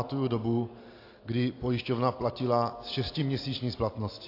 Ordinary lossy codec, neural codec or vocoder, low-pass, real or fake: AAC, 32 kbps; none; 5.4 kHz; real